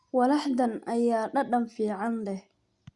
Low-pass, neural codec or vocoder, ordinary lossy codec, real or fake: 10.8 kHz; none; Opus, 64 kbps; real